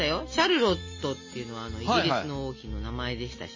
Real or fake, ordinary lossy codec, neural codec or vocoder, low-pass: real; MP3, 32 kbps; none; 7.2 kHz